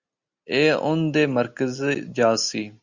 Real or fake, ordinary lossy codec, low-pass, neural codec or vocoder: real; Opus, 64 kbps; 7.2 kHz; none